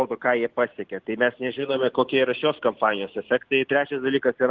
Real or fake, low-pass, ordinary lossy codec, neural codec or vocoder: real; 7.2 kHz; Opus, 16 kbps; none